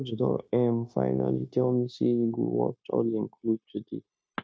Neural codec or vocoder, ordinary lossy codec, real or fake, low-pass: codec, 16 kHz, 0.9 kbps, LongCat-Audio-Codec; none; fake; none